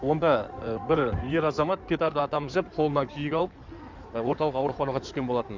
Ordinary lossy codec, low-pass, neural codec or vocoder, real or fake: MP3, 64 kbps; 7.2 kHz; codec, 16 kHz in and 24 kHz out, 2.2 kbps, FireRedTTS-2 codec; fake